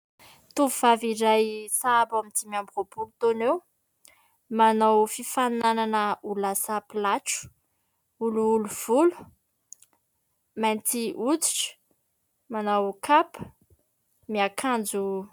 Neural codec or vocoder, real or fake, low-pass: none; real; 19.8 kHz